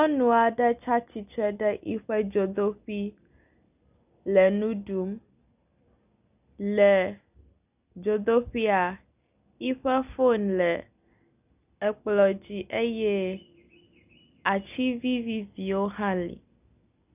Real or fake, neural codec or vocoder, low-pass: real; none; 3.6 kHz